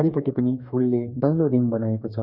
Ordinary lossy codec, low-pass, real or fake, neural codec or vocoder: none; 5.4 kHz; fake; codec, 44.1 kHz, 2.6 kbps, DAC